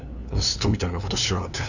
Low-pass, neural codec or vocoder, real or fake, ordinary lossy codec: 7.2 kHz; codec, 16 kHz, 2 kbps, FunCodec, trained on LibriTTS, 25 frames a second; fake; none